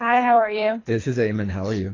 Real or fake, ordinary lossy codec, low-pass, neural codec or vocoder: fake; AAC, 32 kbps; 7.2 kHz; codec, 24 kHz, 3 kbps, HILCodec